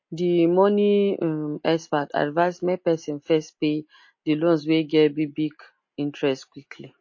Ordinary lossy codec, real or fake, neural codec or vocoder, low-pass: MP3, 32 kbps; real; none; 7.2 kHz